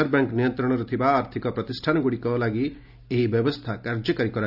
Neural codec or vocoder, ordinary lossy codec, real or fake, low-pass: none; none; real; 5.4 kHz